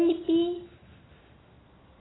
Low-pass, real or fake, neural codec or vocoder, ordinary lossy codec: 7.2 kHz; real; none; AAC, 16 kbps